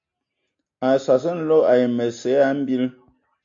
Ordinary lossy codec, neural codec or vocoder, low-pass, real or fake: AAC, 48 kbps; none; 7.2 kHz; real